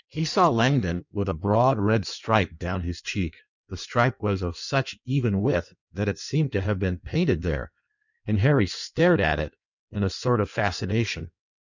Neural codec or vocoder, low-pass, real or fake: codec, 16 kHz in and 24 kHz out, 1.1 kbps, FireRedTTS-2 codec; 7.2 kHz; fake